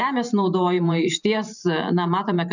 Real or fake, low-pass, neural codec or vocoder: fake; 7.2 kHz; vocoder, 44.1 kHz, 128 mel bands every 512 samples, BigVGAN v2